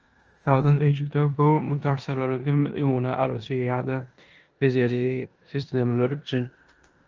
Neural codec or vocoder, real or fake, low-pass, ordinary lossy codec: codec, 16 kHz in and 24 kHz out, 0.9 kbps, LongCat-Audio-Codec, four codebook decoder; fake; 7.2 kHz; Opus, 24 kbps